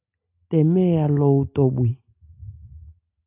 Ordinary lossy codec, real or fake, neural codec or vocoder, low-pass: AAC, 32 kbps; real; none; 3.6 kHz